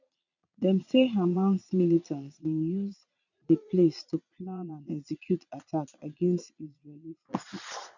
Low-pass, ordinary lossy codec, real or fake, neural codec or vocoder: 7.2 kHz; none; fake; vocoder, 22.05 kHz, 80 mel bands, Vocos